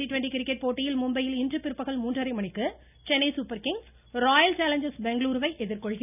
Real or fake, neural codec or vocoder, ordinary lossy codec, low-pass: real; none; none; 3.6 kHz